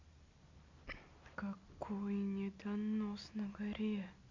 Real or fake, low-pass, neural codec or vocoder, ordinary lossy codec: real; 7.2 kHz; none; MP3, 48 kbps